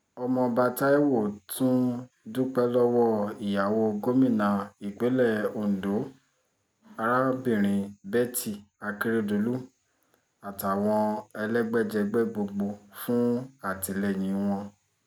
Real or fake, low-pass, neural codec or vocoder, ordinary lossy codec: real; none; none; none